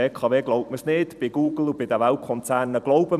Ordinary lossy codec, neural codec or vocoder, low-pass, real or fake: none; vocoder, 44.1 kHz, 128 mel bands every 256 samples, BigVGAN v2; 14.4 kHz; fake